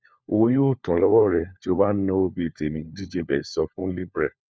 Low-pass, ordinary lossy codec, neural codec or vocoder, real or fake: none; none; codec, 16 kHz, 4 kbps, FunCodec, trained on LibriTTS, 50 frames a second; fake